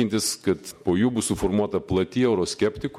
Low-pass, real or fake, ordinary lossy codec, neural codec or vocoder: 19.8 kHz; fake; MP3, 64 kbps; vocoder, 48 kHz, 128 mel bands, Vocos